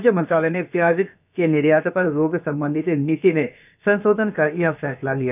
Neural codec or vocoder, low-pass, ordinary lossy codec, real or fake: codec, 16 kHz, about 1 kbps, DyCAST, with the encoder's durations; 3.6 kHz; none; fake